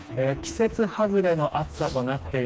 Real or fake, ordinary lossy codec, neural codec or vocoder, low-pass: fake; none; codec, 16 kHz, 2 kbps, FreqCodec, smaller model; none